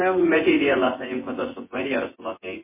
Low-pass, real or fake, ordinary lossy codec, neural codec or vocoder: 3.6 kHz; fake; MP3, 16 kbps; vocoder, 24 kHz, 100 mel bands, Vocos